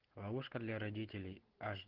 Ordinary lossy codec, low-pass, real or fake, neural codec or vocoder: Opus, 16 kbps; 5.4 kHz; real; none